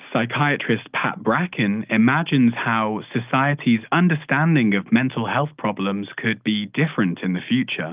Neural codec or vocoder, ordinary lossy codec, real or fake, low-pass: none; Opus, 32 kbps; real; 3.6 kHz